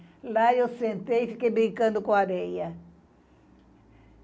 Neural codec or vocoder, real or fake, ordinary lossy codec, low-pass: none; real; none; none